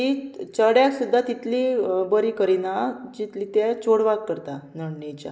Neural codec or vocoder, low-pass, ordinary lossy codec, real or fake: none; none; none; real